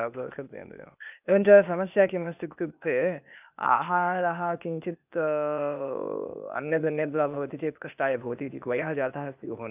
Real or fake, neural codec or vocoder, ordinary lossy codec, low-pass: fake; codec, 16 kHz, 0.8 kbps, ZipCodec; none; 3.6 kHz